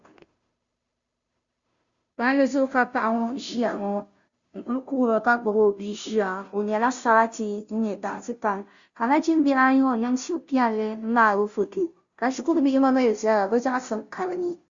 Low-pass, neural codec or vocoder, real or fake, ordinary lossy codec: 7.2 kHz; codec, 16 kHz, 0.5 kbps, FunCodec, trained on Chinese and English, 25 frames a second; fake; Opus, 64 kbps